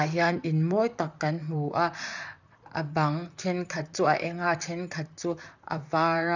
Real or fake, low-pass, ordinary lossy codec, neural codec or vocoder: fake; 7.2 kHz; none; vocoder, 44.1 kHz, 128 mel bands, Pupu-Vocoder